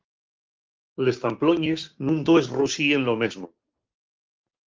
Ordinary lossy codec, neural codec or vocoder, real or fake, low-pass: Opus, 32 kbps; codec, 16 kHz, 6 kbps, DAC; fake; 7.2 kHz